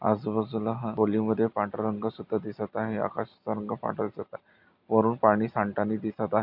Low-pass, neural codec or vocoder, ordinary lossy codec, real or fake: 5.4 kHz; none; none; real